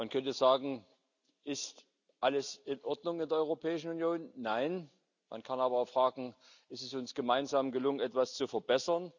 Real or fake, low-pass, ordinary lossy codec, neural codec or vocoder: real; 7.2 kHz; none; none